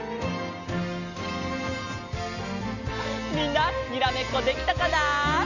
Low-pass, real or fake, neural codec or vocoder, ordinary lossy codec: 7.2 kHz; real; none; none